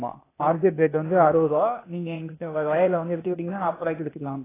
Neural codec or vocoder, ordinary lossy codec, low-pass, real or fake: codec, 16 kHz, 0.8 kbps, ZipCodec; AAC, 16 kbps; 3.6 kHz; fake